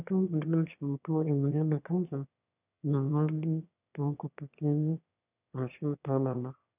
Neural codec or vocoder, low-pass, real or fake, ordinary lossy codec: autoencoder, 22.05 kHz, a latent of 192 numbers a frame, VITS, trained on one speaker; 3.6 kHz; fake; none